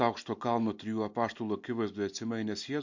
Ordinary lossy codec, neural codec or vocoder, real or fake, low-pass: MP3, 48 kbps; none; real; 7.2 kHz